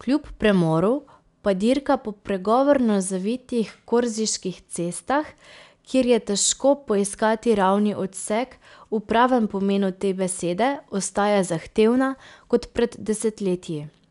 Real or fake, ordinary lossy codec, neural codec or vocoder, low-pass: real; none; none; 10.8 kHz